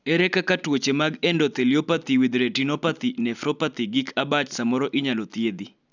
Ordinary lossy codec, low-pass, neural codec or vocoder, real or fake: none; 7.2 kHz; none; real